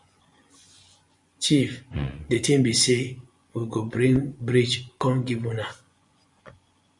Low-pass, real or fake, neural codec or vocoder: 10.8 kHz; fake; vocoder, 24 kHz, 100 mel bands, Vocos